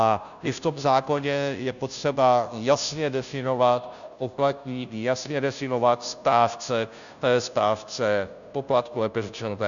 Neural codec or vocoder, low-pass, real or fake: codec, 16 kHz, 0.5 kbps, FunCodec, trained on Chinese and English, 25 frames a second; 7.2 kHz; fake